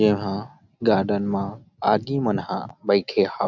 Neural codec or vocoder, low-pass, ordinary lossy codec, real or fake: none; 7.2 kHz; none; real